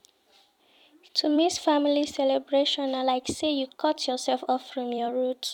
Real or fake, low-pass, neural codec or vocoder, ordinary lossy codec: fake; 19.8 kHz; vocoder, 44.1 kHz, 128 mel bands every 256 samples, BigVGAN v2; none